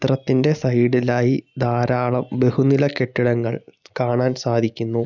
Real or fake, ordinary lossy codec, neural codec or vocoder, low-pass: real; none; none; 7.2 kHz